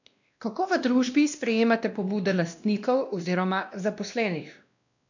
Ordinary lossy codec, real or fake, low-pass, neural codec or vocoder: none; fake; 7.2 kHz; codec, 16 kHz, 1 kbps, X-Codec, WavLM features, trained on Multilingual LibriSpeech